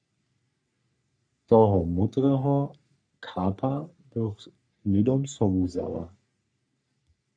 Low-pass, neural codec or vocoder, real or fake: 9.9 kHz; codec, 44.1 kHz, 3.4 kbps, Pupu-Codec; fake